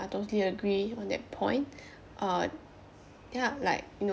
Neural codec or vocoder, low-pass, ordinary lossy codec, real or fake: none; none; none; real